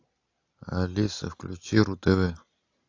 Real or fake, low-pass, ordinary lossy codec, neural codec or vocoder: real; 7.2 kHz; AAC, 48 kbps; none